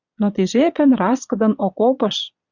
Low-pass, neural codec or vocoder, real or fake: 7.2 kHz; vocoder, 22.05 kHz, 80 mel bands, Vocos; fake